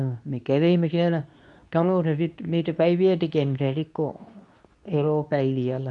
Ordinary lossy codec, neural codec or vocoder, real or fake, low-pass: none; codec, 24 kHz, 0.9 kbps, WavTokenizer, medium speech release version 2; fake; 10.8 kHz